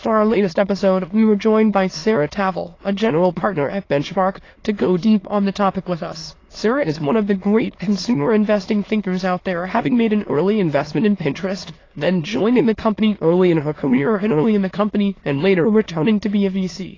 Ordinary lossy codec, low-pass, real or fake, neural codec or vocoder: AAC, 32 kbps; 7.2 kHz; fake; autoencoder, 22.05 kHz, a latent of 192 numbers a frame, VITS, trained on many speakers